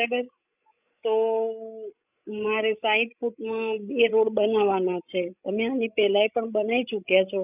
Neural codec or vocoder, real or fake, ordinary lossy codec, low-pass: codec, 16 kHz, 16 kbps, FreqCodec, larger model; fake; none; 3.6 kHz